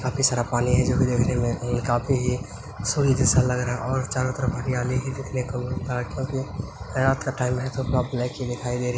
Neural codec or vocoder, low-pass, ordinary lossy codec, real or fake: none; none; none; real